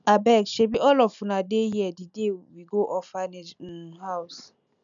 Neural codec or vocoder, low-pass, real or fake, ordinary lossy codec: none; 7.2 kHz; real; none